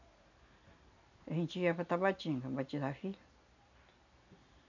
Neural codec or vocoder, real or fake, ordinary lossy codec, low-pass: none; real; none; 7.2 kHz